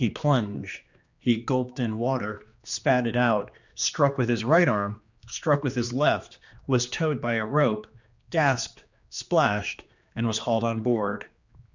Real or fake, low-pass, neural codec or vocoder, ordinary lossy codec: fake; 7.2 kHz; codec, 16 kHz, 2 kbps, X-Codec, HuBERT features, trained on general audio; Opus, 64 kbps